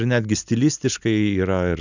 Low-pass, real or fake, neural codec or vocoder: 7.2 kHz; real; none